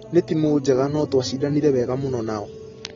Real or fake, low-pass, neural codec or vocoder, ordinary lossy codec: real; 7.2 kHz; none; AAC, 24 kbps